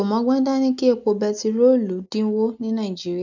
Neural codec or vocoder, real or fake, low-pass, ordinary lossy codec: none; real; 7.2 kHz; none